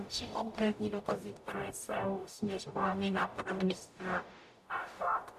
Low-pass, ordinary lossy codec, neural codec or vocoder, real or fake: 14.4 kHz; MP3, 96 kbps; codec, 44.1 kHz, 0.9 kbps, DAC; fake